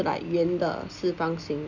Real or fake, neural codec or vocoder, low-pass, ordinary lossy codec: real; none; 7.2 kHz; none